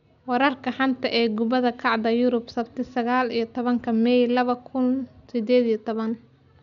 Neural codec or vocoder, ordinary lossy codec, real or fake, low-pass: none; none; real; 7.2 kHz